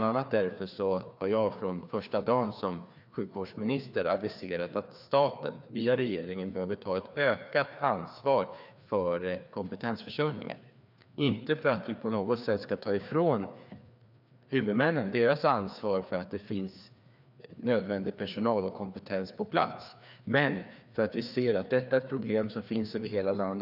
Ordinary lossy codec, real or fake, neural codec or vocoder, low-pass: none; fake; codec, 16 kHz, 2 kbps, FreqCodec, larger model; 5.4 kHz